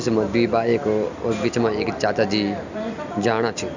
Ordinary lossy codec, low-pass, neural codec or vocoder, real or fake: Opus, 64 kbps; 7.2 kHz; none; real